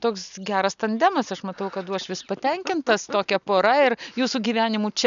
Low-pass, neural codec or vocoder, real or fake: 7.2 kHz; none; real